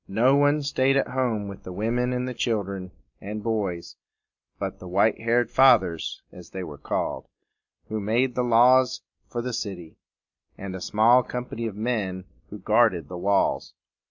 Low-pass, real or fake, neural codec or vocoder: 7.2 kHz; real; none